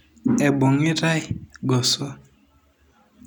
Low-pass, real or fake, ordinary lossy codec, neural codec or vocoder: 19.8 kHz; real; none; none